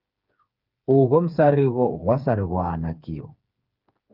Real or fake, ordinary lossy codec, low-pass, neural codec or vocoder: fake; Opus, 24 kbps; 5.4 kHz; codec, 16 kHz, 4 kbps, FreqCodec, smaller model